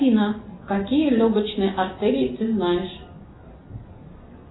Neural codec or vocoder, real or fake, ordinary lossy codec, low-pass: none; real; AAC, 16 kbps; 7.2 kHz